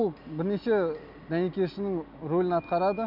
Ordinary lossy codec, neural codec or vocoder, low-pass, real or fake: none; none; 5.4 kHz; real